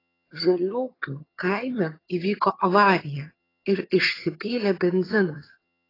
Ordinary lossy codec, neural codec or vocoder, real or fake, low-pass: AAC, 24 kbps; vocoder, 22.05 kHz, 80 mel bands, HiFi-GAN; fake; 5.4 kHz